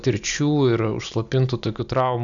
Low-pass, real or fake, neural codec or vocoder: 7.2 kHz; real; none